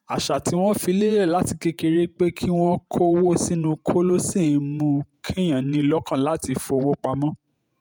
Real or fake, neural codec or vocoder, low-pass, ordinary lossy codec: fake; vocoder, 48 kHz, 128 mel bands, Vocos; none; none